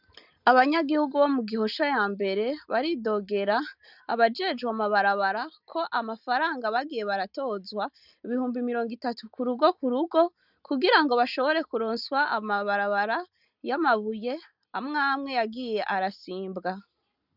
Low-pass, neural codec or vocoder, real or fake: 5.4 kHz; none; real